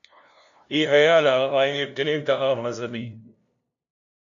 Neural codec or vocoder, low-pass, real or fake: codec, 16 kHz, 0.5 kbps, FunCodec, trained on LibriTTS, 25 frames a second; 7.2 kHz; fake